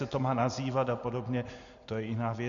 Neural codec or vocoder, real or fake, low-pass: none; real; 7.2 kHz